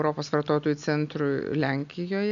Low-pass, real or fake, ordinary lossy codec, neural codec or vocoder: 7.2 kHz; real; MP3, 96 kbps; none